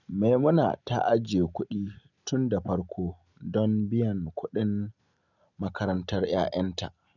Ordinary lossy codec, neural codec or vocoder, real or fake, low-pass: none; none; real; 7.2 kHz